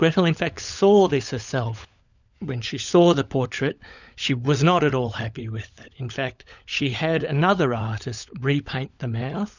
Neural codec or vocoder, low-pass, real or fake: codec, 16 kHz, 16 kbps, FunCodec, trained on LibriTTS, 50 frames a second; 7.2 kHz; fake